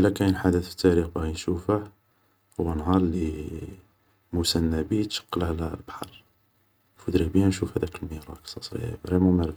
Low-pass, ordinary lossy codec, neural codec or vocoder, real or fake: none; none; none; real